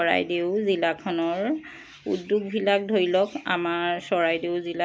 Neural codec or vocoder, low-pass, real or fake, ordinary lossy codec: none; none; real; none